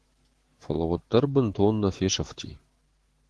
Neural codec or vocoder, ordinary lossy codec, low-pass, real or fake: autoencoder, 48 kHz, 128 numbers a frame, DAC-VAE, trained on Japanese speech; Opus, 16 kbps; 10.8 kHz; fake